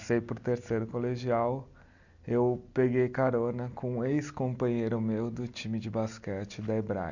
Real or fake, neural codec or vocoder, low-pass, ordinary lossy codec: real; none; 7.2 kHz; none